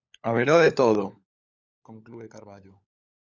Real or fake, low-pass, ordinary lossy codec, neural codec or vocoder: fake; 7.2 kHz; Opus, 64 kbps; codec, 16 kHz, 16 kbps, FunCodec, trained on LibriTTS, 50 frames a second